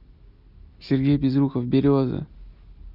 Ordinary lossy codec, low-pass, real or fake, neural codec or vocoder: none; 5.4 kHz; real; none